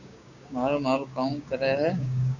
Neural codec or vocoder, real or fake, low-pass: codec, 16 kHz, 6 kbps, DAC; fake; 7.2 kHz